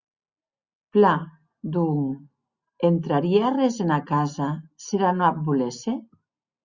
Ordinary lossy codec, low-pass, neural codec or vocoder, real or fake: Opus, 64 kbps; 7.2 kHz; none; real